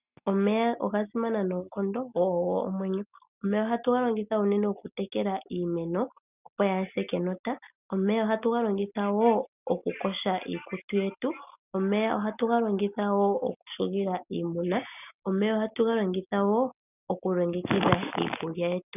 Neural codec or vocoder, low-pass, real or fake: none; 3.6 kHz; real